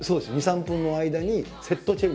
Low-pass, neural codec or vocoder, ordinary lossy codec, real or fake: none; none; none; real